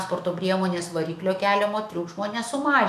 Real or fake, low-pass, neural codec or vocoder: fake; 14.4 kHz; autoencoder, 48 kHz, 128 numbers a frame, DAC-VAE, trained on Japanese speech